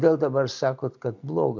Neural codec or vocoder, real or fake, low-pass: none; real; 7.2 kHz